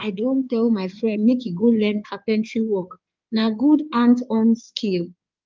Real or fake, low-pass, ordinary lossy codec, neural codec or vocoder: fake; 7.2 kHz; Opus, 32 kbps; codec, 16 kHz in and 24 kHz out, 2.2 kbps, FireRedTTS-2 codec